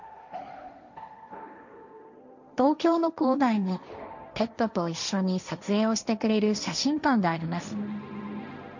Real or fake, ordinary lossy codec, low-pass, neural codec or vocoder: fake; none; 7.2 kHz; codec, 16 kHz, 1.1 kbps, Voila-Tokenizer